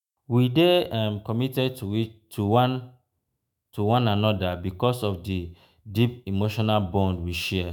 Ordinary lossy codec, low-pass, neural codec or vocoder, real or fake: none; 19.8 kHz; autoencoder, 48 kHz, 128 numbers a frame, DAC-VAE, trained on Japanese speech; fake